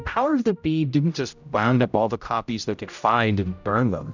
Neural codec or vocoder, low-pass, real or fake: codec, 16 kHz, 0.5 kbps, X-Codec, HuBERT features, trained on general audio; 7.2 kHz; fake